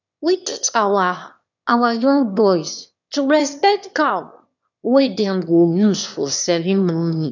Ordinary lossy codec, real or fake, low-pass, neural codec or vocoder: none; fake; 7.2 kHz; autoencoder, 22.05 kHz, a latent of 192 numbers a frame, VITS, trained on one speaker